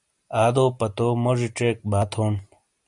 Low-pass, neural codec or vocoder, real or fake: 10.8 kHz; none; real